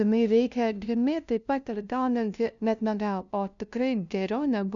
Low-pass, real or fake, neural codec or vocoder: 7.2 kHz; fake; codec, 16 kHz, 0.5 kbps, FunCodec, trained on LibriTTS, 25 frames a second